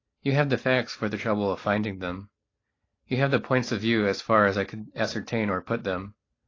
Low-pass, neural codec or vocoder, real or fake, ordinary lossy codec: 7.2 kHz; none; real; AAC, 32 kbps